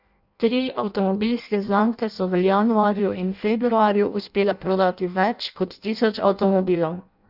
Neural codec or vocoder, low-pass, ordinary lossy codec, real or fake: codec, 16 kHz in and 24 kHz out, 0.6 kbps, FireRedTTS-2 codec; 5.4 kHz; none; fake